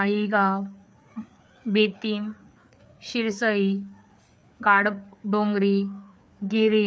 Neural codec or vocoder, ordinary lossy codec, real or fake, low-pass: codec, 16 kHz, 4 kbps, FreqCodec, larger model; none; fake; none